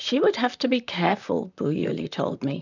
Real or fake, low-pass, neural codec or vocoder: fake; 7.2 kHz; vocoder, 44.1 kHz, 128 mel bands, Pupu-Vocoder